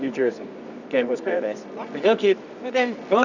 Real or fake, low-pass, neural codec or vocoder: fake; 7.2 kHz; codec, 24 kHz, 0.9 kbps, WavTokenizer, medium music audio release